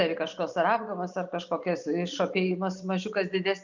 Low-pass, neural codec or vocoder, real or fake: 7.2 kHz; none; real